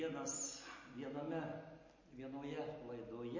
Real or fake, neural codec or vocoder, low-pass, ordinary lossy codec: real; none; 7.2 kHz; MP3, 32 kbps